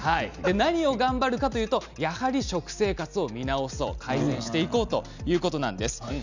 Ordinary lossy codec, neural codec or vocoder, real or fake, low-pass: none; none; real; 7.2 kHz